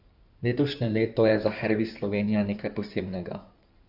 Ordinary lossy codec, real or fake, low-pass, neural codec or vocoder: none; fake; 5.4 kHz; codec, 16 kHz in and 24 kHz out, 2.2 kbps, FireRedTTS-2 codec